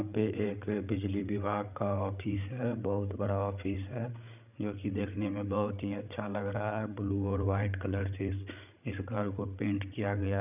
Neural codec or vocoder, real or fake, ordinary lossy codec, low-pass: codec, 16 kHz, 8 kbps, FreqCodec, larger model; fake; none; 3.6 kHz